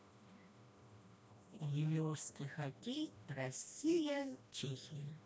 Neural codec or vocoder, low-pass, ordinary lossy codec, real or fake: codec, 16 kHz, 1 kbps, FreqCodec, smaller model; none; none; fake